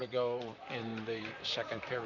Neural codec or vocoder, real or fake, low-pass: vocoder, 44.1 kHz, 128 mel bands, Pupu-Vocoder; fake; 7.2 kHz